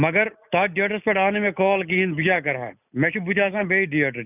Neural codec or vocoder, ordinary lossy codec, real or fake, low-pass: none; none; real; 3.6 kHz